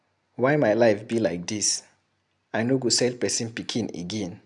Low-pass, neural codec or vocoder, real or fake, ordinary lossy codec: 10.8 kHz; none; real; none